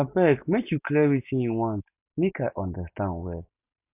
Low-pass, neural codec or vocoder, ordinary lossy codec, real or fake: 3.6 kHz; none; none; real